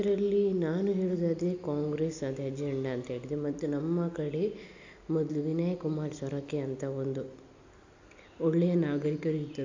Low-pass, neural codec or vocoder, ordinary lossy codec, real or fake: 7.2 kHz; none; AAC, 48 kbps; real